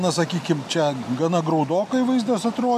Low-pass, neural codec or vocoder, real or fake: 14.4 kHz; none; real